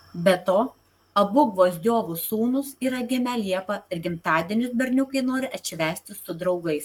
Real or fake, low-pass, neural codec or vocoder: fake; 19.8 kHz; codec, 44.1 kHz, 7.8 kbps, Pupu-Codec